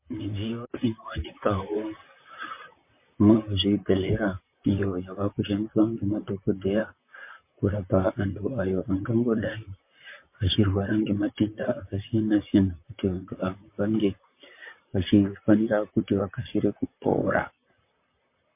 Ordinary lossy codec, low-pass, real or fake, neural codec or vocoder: MP3, 24 kbps; 3.6 kHz; fake; vocoder, 44.1 kHz, 128 mel bands, Pupu-Vocoder